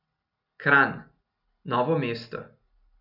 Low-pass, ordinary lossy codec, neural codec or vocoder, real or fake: 5.4 kHz; none; none; real